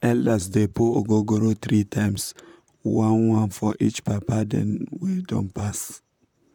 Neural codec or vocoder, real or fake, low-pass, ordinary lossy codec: vocoder, 44.1 kHz, 128 mel bands, Pupu-Vocoder; fake; 19.8 kHz; none